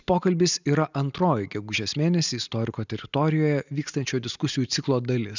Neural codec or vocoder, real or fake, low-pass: none; real; 7.2 kHz